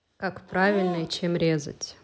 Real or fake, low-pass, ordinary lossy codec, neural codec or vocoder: real; none; none; none